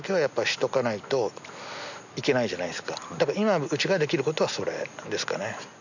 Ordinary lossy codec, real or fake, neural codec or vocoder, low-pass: none; real; none; 7.2 kHz